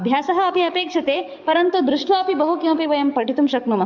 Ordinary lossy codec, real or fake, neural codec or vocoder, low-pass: none; fake; codec, 44.1 kHz, 7.8 kbps, DAC; 7.2 kHz